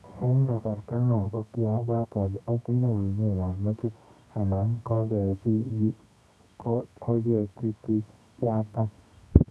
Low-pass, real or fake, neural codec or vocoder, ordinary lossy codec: none; fake; codec, 24 kHz, 0.9 kbps, WavTokenizer, medium music audio release; none